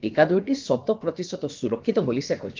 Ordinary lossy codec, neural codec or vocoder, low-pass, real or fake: Opus, 32 kbps; codec, 16 kHz, about 1 kbps, DyCAST, with the encoder's durations; 7.2 kHz; fake